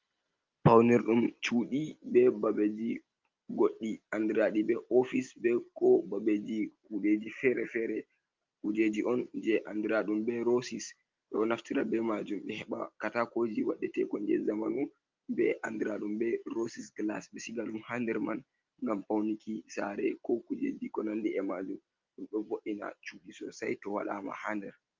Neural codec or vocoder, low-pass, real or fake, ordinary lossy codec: none; 7.2 kHz; real; Opus, 24 kbps